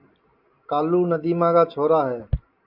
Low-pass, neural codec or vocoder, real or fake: 5.4 kHz; none; real